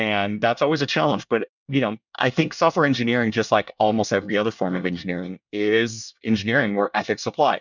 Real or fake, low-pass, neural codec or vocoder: fake; 7.2 kHz; codec, 24 kHz, 1 kbps, SNAC